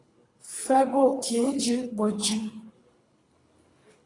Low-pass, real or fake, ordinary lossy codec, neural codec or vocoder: 10.8 kHz; fake; AAC, 32 kbps; codec, 24 kHz, 3 kbps, HILCodec